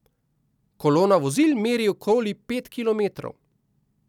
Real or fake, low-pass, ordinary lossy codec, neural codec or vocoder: real; 19.8 kHz; none; none